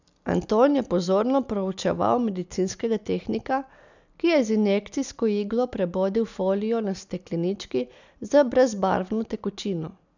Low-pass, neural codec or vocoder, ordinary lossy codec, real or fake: 7.2 kHz; none; none; real